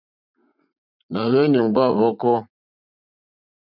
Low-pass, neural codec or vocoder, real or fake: 5.4 kHz; vocoder, 44.1 kHz, 80 mel bands, Vocos; fake